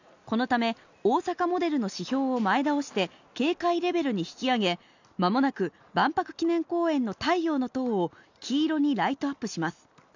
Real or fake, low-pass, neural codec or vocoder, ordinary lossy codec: real; 7.2 kHz; none; none